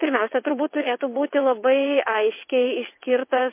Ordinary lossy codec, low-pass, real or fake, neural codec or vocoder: MP3, 24 kbps; 3.6 kHz; fake; vocoder, 22.05 kHz, 80 mel bands, WaveNeXt